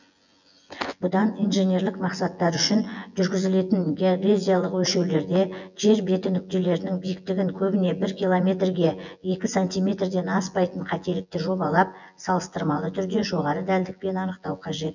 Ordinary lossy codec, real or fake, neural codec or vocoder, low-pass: none; fake; vocoder, 24 kHz, 100 mel bands, Vocos; 7.2 kHz